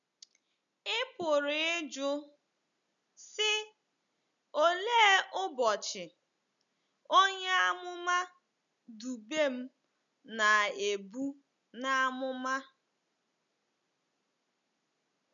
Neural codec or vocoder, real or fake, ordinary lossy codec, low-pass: none; real; none; 7.2 kHz